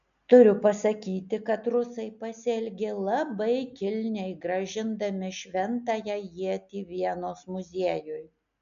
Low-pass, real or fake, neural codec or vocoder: 7.2 kHz; real; none